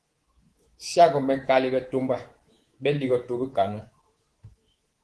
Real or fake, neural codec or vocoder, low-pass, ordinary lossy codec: fake; codec, 24 kHz, 3.1 kbps, DualCodec; 10.8 kHz; Opus, 16 kbps